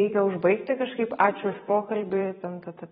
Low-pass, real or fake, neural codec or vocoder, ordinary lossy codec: 19.8 kHz; fake; vocoder, 44.1 kHz, 128 mel bands every 512 samples, BigVGAN v2; AAC, 16 kbps